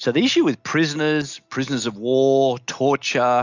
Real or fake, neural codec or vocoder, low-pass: real; none; 7.2 kHz